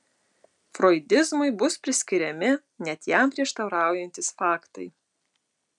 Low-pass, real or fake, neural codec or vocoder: 10.8 kHz; real; none